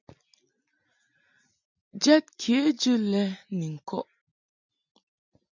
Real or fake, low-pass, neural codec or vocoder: real; 7.2 kHz; none